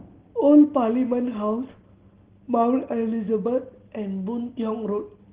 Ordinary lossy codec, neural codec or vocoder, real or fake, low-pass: Opus, 16 kbps; none; real; 3.6 kHz